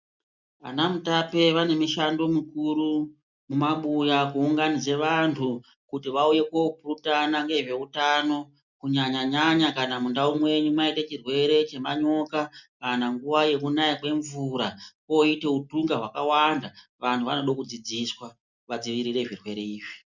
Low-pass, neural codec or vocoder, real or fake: 7.2 kHz; none; real